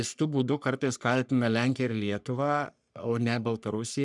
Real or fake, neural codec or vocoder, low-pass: fake; codec, 44.1 kHz, 3.4 kbps, Pupu-Codec; 10.8 kHz